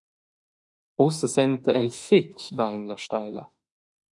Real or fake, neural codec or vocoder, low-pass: fake; autoencoder, 48 kHz, 32 numbers a frame, DAC-VAE, trained on Japanese speech; 10.8 kHz